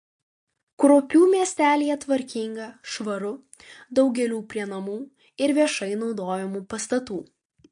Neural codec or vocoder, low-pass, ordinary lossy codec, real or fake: none; 9.9 kHz; MP3, 48 kbps; real